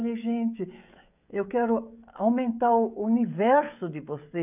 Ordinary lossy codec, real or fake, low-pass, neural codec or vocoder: none; fake; 3.6 kHz; codec, 16 kHz, 16 kbps, FreqCodec, smaller model